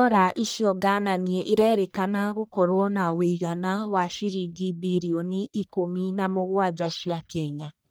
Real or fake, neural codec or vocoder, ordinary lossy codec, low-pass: fake; codec, 44.1 kHz, 1.7 kbps, Pupu-Codec; none; none